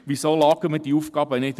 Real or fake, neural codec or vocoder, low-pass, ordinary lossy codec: fake; vocoder, 44.1 kHz, 128 mel bands every 256 samples, BigVGAN v2; 14.4 kHz; MP3, 96 kbps